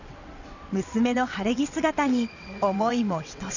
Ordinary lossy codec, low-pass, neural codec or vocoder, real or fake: none; 7.2 kHz; vocoder, 22.05 kHz, 80 mel bands, WaveNeXt; fake